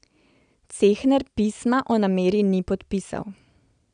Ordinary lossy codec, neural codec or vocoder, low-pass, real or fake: none; none; 9.9 kHz; real